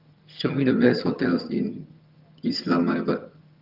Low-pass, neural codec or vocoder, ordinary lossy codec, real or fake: 5.4 kHz; vocoder, 22.05 kHz, 80 mel bands, HiFi-GAN; Opus, 24 kbps; fake